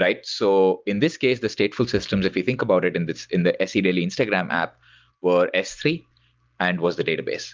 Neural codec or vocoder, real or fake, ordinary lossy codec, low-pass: none; real; Opus, 24 kbps; 7.2 kHz